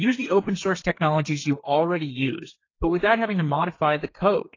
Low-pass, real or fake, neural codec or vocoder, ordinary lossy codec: 7.2 kHz; fake; codec, 32 kHz, 1.9 kbps, SNAC; AAC, 32 kbps